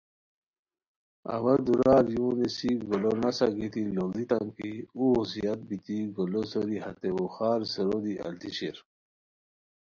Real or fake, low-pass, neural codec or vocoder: real; 7.2 kHz; none